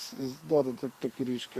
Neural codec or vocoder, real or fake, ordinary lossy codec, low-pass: codec, 44.1 kHz, 2.6 kbps, SNAC; fake; Opus, 64 kbps; 14.4 kHz